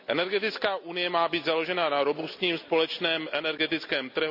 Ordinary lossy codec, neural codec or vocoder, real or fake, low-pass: none; none; real; 5.4 kHz